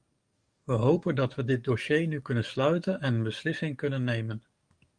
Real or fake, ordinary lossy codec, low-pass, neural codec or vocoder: fake; Opus, 32 kbps; 9.9 kHz; codec, 44.1 kHz, 7.8 kbps, DAC